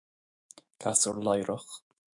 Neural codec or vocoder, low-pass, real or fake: autoencoder, 48 kHz, 128 numbers a frame, DAC-VAE, trained on Japanese speech; 10.8 kHz; fake